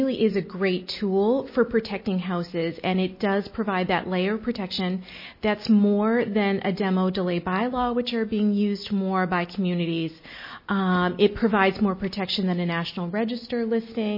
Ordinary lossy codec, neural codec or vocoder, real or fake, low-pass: MP3, 24 kbps; none; real; 5.4 kHz